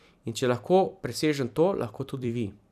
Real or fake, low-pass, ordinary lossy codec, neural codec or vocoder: fake; 14.4 kHz; none; vocoder, 44.1 kHz, 128 mel bands every 256 samples, BigVGAN v2